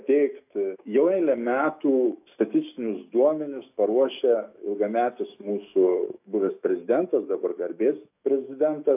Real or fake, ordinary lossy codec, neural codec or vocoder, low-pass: fake; AAC, 32 kbps; autoencoder, 48 kHz, 128 numbers a frame, DAC-VAE, trained on Japanese speech; 3.6 kHz